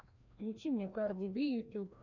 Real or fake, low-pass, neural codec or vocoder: fake; 7.2 kHz; codec, 16 kHz, 1 kbps, FreqCodec, larger model